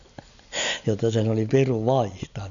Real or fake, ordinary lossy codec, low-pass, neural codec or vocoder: real; none; 7.2 kHz; none